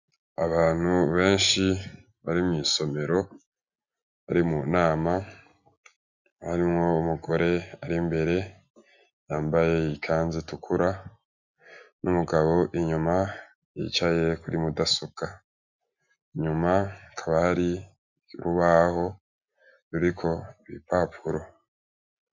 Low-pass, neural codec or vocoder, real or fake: 7.2 kHz; none; real